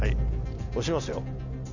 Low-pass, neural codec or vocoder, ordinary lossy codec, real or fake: 7.2 kHz; none; none; real